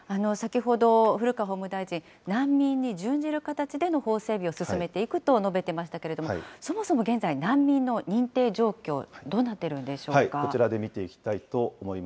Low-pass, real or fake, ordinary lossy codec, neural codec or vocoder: none; real; none; none